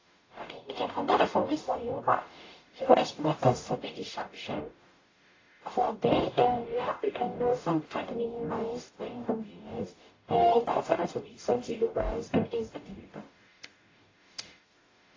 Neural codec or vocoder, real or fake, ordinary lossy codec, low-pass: codec, 44.1 kHz, 0.9 kbps, DAC; fake; AAC, 32 kbps; 7.2 kHz